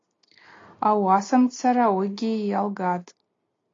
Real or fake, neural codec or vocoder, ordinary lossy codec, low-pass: real; none; AAC, 32 kbps; 7.2 kHz